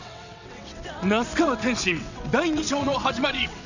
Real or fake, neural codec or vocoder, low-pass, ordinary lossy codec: fake; vocoder, 22.05 kHz, 80 mel bands, WaveNeXt; 7.2 kHz; none